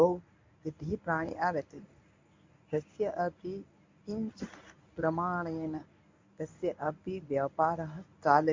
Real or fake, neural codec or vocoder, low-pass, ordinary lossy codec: fake; codec, 24 kHz, 0.9 kbps, WavTokenizer, medium speech release version 1; 7.2 kHz; MP3, 48 kbps